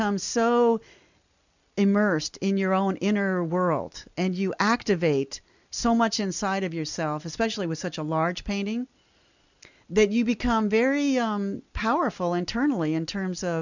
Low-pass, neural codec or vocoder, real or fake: 7.2 kHz; none; real